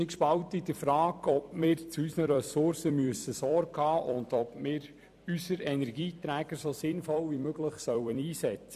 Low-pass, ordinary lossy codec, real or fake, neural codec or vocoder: 14.4 kHz; none; fake; vocoder, 44.1 kHz, 128 mel bands every 256 samples, BigVGAN v2